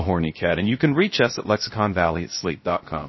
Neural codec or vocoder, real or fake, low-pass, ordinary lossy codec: codec, 16 kHz, 0.3 kbps, FocalCodec; fake; 7.2 kHz; MP3, 24 kbps